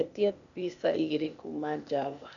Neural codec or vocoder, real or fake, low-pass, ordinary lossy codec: codec, 16 kHz, 0.8 kbps, ZipCodec; fake; 7.2 kHz; none